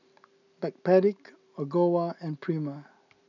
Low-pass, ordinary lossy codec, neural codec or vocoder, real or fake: 7.2 kHz; none; none; real